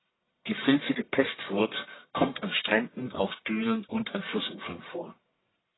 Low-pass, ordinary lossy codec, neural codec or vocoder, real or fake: 7.2 kHz; AAC, 16 kbps; codec, 44.1 kHz, 1.7 kbps, Pupu-Codec; fake